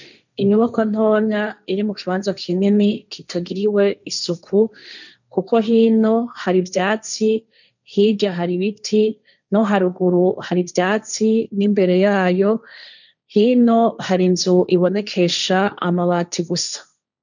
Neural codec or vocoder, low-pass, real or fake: codec, 16 kHz, 1.1 kbps, Voila-Tokenizer; 7.2 kHz; fake